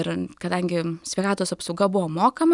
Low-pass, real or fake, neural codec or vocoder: 10.8 kHz; real; none